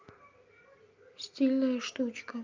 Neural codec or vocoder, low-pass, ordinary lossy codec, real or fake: none; 7.2 kHz; Opus, 24 kbps; real